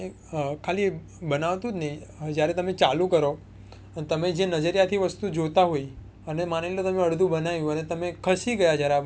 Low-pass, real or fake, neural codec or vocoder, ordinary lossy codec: none; real; none; none